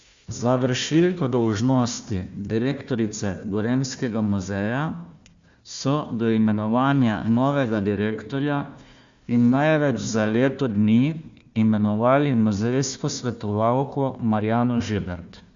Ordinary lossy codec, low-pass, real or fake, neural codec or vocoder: Opus, 64 kbps; 7.2 kHz; fake; codec, 16 kHz, 1 kbps, FunCodec, trained on Chinese and English, 50 frames a second